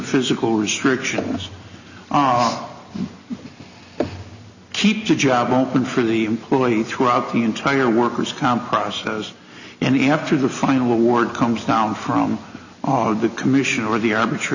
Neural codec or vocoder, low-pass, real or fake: none; 7.2 kHz; real